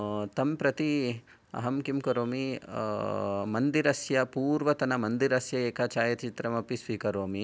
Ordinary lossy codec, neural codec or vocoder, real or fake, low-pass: none; none; real; none